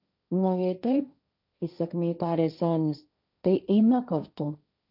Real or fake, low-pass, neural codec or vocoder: fake; 5.4 kHz; codec, 16 kHz, 1.1 kbps, Voila-Tokenizer